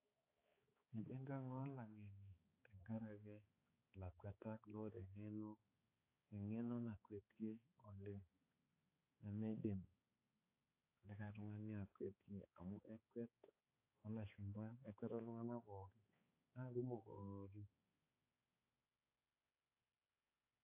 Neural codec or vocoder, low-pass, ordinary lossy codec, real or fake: codec, 16 kHz, 4 kbps, X-Codec, HuBERT features, trained on balanced general audio; 3.6 kHz; AAC, 24 kbps; fake